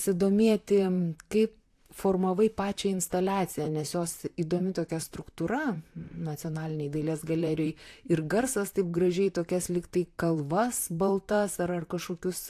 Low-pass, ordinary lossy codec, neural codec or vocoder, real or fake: 14.4 kHz; AAC, 64 kbps; vocoder, 44.1 kHz, 128 mel bands, Pupu-Vocoder; fake